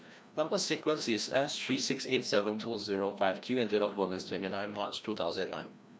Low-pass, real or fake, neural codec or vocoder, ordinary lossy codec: none; fake; codec, 16 kHz, 1 kbps, FreqCodec, larger model; none